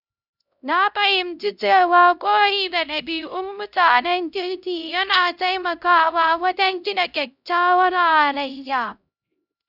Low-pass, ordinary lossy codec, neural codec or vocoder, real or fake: 5.4 kHz; none; codec, 16 kHz, 0.5 kbps, X-Codec, HuBERT features, trained on LibriSpeech; fake